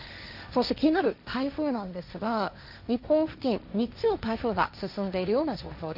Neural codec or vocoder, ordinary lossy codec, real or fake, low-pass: codec, 16 kHz, 1.1 kbps, Voila-Tokenizer; none; fake; 5.4 kHz